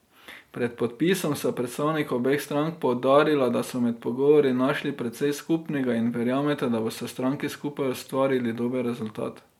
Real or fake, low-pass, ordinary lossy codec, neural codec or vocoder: real; 19.8 kHz; MP3, 96 kbps; none